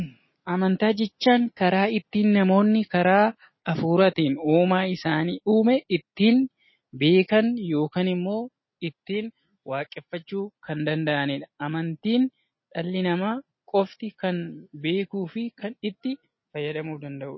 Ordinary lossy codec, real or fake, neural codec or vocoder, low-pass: MP3, 24 kbps; real; none; 7.2 kHz